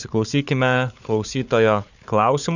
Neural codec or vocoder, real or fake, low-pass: codec, 16 kHz, 4 kbps, FunCodec, trained on Chinese and English, 50 frames a second; fake; 7.2 kHz